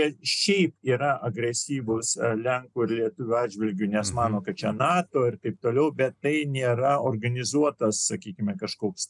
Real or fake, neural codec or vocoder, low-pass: real; none; 10.8 kHz